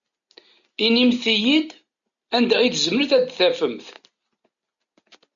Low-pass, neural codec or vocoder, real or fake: 7.2 kHz; none; real